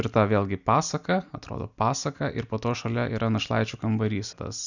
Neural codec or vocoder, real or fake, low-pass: none; real; 7.2 kHz